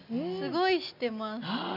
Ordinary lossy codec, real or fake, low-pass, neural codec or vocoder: none; real; 5.4 kHz; none